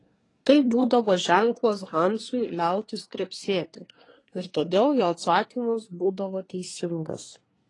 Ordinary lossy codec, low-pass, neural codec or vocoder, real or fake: AAC, 32 kbps; 10.8 kHz; codec, 24 kHz, 1 kbps, SNAC; fake